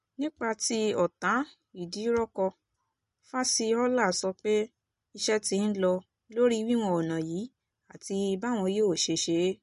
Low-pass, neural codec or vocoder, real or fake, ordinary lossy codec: 14.4 kHz; none; real; MP3, 48 kbps